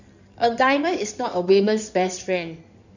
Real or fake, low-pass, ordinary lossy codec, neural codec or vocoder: fake; 7.2 kHz; none; codec, 16 kHz in and 24 kHz out, 2.2 kbps, FireRedTTS-2 codec